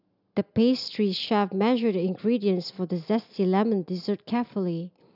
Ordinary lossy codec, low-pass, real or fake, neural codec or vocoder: none; 5.4 kHz; real; none